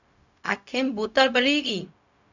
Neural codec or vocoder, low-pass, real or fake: codec, 16 kHz, 0.4 kbps, LongCat-Audio-Codec; 7.2 kHz; fake